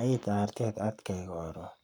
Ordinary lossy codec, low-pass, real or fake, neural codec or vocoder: none; 19.8 kHz; fake; codec, 44.1 kHz, 7.8 kbps, Pupu-Codec